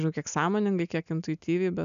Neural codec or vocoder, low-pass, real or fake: none; 7.2 kHz; real